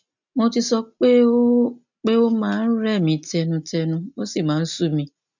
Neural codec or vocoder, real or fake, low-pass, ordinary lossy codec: none; real; 7.2 kHz; none